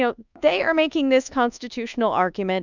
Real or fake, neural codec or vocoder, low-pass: fake; codec, 24 kHz, 1.2 kbps, DualCodec; 7.2 kHz